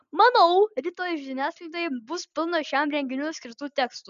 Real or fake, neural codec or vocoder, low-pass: real; none; 7.2 kHz